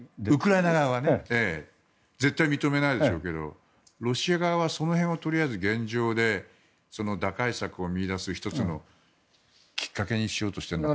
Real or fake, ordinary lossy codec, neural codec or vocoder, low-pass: real; none; none; none